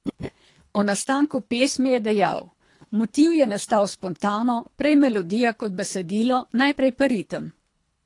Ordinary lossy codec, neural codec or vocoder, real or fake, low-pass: AAC, 48 kbps; codec, 24 kHz, 3 kbps, HILCodec; fake; 10.8 kHz